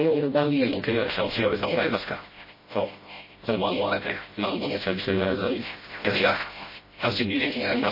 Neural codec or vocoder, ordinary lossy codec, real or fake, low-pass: codec, 16 kHz, 0.5 kbps, FreqCodec, smaller model; MP3, 24 kbps; fake; 5.4 kHz